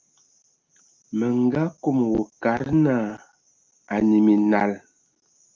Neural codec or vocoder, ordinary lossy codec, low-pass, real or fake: none; Opus, 24 kbps; 7.2 kHz; real